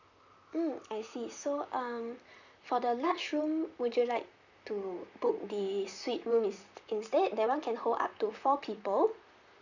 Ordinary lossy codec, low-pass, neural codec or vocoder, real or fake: none; 7.2 kHz; vocoder, 44.1 kHz, 128 mel bands, Pupu-Vocoder; fake